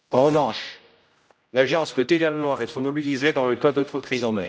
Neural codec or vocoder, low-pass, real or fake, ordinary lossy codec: codec, 16 kHz, 0.5 kbps, X-Codec, HuBERT features, trained on general audio; none; fake; none